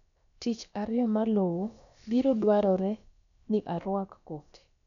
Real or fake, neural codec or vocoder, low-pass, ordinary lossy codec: fake; codec, 16 kHz, about 1 kbps, DyCAST, with the encoder's durations; 7.2 kHz; none